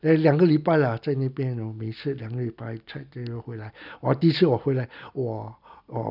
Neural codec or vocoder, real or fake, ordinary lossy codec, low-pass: none; real; none; 5.4 kHz